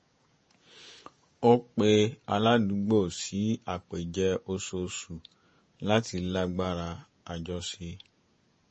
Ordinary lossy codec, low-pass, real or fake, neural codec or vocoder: MP3, 32 kbps; 10.8 kHz; fake; vocoder, 48 kHz, 128 mel bands, Vocos